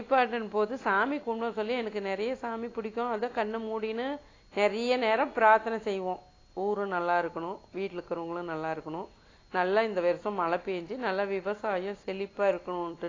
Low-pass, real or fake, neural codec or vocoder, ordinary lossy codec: 7.2 kHz; real; none; AAC, 32 kbps